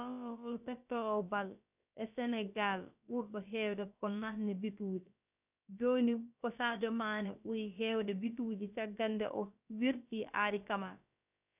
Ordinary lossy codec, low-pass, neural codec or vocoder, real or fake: none; 3.6 kHz; codec, 16 kHz, about 1 kbps, DyCAST, with the encoder's durations; fake